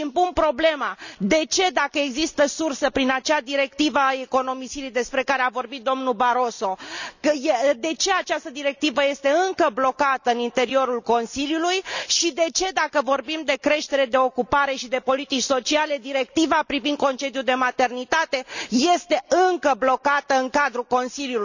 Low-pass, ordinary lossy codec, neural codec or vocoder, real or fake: 7.2 kHz; none; none; real